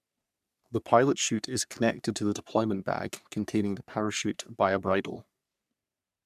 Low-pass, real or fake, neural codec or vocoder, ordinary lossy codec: 14.4 kHz; fake; codec, 44.1 kHz, 3.4 kbps, Pupu-Codec; none